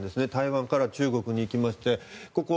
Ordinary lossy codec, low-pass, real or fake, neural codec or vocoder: none; none; real; none